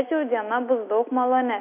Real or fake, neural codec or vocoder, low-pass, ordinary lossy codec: real; none; 3.6 kHz; MP3, 32 kbps